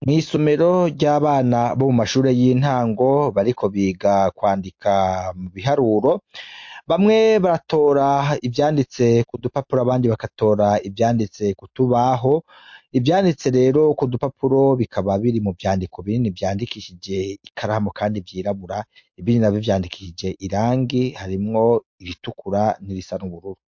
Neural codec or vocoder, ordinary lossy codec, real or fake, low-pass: none; MP3, 48 kbps; real; 7.2 kHz